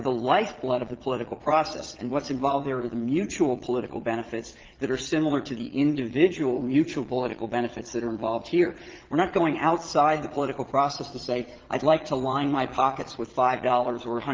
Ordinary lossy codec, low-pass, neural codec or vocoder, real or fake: Opus, 16 kbps; 7.2 kHz; vocoder, 22.05 kHz, 80 mel bands, Vocos; fake